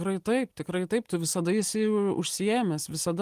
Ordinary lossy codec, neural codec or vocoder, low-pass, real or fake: Opus, 24 kbps; none; 14.4 kHz; real